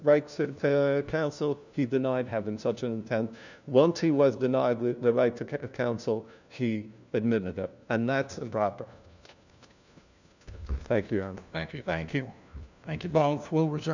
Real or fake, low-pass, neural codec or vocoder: fake; 7.2 kHz; codec, 16 kHz, 1 kbps, FunCodec, trained on LibriTTS, 50 frames a second